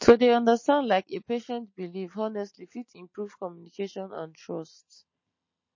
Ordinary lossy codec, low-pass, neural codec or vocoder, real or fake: MP3, 32 kbps; 7.2 kHz; none; real